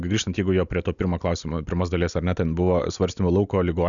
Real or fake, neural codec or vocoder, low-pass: real; none; 7.2 kHz